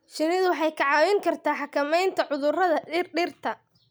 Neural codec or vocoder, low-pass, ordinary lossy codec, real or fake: none; none; none; real